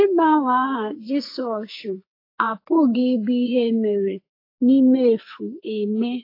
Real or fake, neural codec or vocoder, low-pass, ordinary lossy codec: fake; codec, 44.1 kHz, 2.6 kbps, SNAC; 5.4 kHz; AAC, 32 kbps